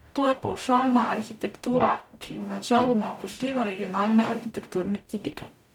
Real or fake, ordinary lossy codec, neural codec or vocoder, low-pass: fake; none; codec, 44.1 kHz, 0.9 kbps, DAC; 19.8 kHz